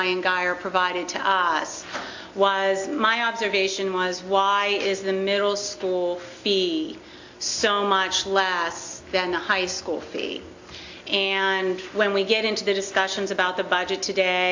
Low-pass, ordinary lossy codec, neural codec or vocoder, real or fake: 7.2 kHz; AAC, 48 kbps; none; real